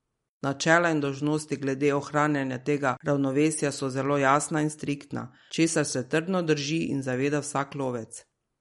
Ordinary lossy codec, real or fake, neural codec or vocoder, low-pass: MP3, 48 kbps; real; none; 19.8 kHz